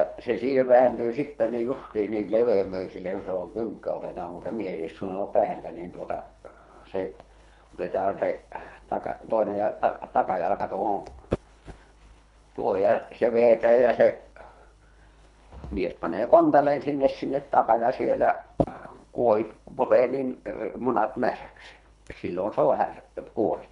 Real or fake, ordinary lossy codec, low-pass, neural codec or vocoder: fake; none; 10.8 kHz; codec, 24 kHz, 3 kbps, HILCodec